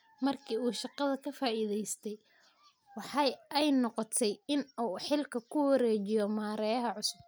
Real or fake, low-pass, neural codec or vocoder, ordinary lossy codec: real; none; none; none